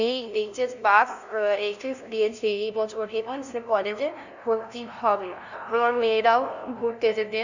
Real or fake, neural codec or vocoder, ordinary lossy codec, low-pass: fake; codec, 16 kHz, 0.5 kbps, FunCodec, trained on LibriTTS, 25 frames a second; none; 7.2 kHz